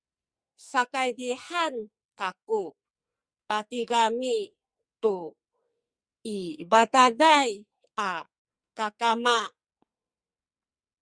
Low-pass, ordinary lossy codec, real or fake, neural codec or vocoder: 9.9 kHz; Opus, 64 kbps; fake; codec, 44.1 kHz, 2.6 kbps, SNAC